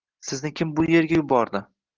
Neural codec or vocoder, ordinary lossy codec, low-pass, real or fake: none; Opus, 16 kbps; 7.2 kHz; real